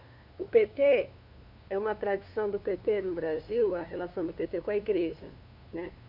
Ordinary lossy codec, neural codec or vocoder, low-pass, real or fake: none; codec, 16 kHz, 2 kbps, FunCodec, trained on LibriTTS, 25 frames a second; 5.4 kHz; fake